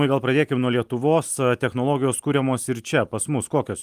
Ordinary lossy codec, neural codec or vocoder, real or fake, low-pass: Opus, 32 kbps; none; real; 14.4 kHz